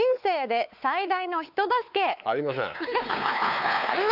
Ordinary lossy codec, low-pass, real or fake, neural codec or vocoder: none; 5.4 kHz; fake; codec, 16 kHz, 4 kbps, X-Codec, WavLM features, trained on Multilingual LibriSpeech